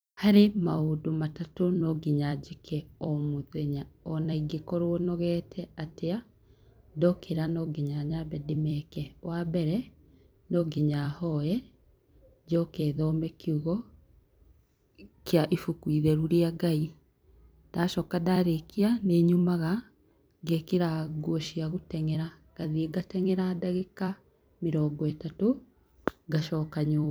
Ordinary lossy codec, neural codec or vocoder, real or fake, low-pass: none; vocoder, 44.1 kHz, 128 mel bands every 256 samples, BigVGAN v2; fake; none